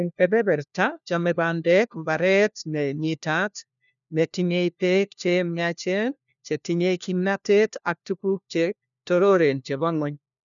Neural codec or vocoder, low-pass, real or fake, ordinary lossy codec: codec, 16 kHz, 1 kbps, FunCodec, trained on LibriTTS, 50 frames a second; 7.2 kHz; fake; none